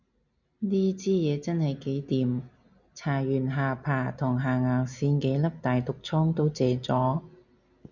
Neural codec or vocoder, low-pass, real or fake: none; 7.2 kHz; real